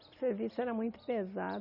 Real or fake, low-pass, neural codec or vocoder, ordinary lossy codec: real; 5.4 kHz; none; none